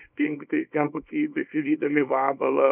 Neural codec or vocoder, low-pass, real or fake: codec, 24 kHz, 0.9 kbps, WavTokenizer, small release; 3.6 kHz; fake